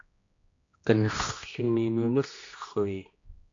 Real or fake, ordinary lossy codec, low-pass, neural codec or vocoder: fake; AAC, 48 kbps; 7.2 kHz; codec, 16 kHz, 1 kbps, X-Codec, HuBERT features, trained on general audio